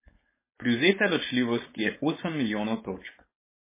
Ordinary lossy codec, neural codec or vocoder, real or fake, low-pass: MP3, 16 kbps; codec, 16 kHz, 4.8 kbps, FACodec; fake; 3.6 kHz